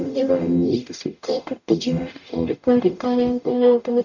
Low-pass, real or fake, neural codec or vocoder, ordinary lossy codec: 7.2 kHz; fake; codec, 44.1 kHz, 0.9 kbps, DAC; none